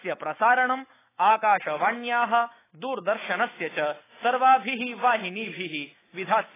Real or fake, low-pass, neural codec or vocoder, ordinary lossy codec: fake; 3.6 kHz; autoencoder, 48 kHz, 128 numbers a frame, DAC-VAE, trained on Japanese speech; AAC, 16 kbps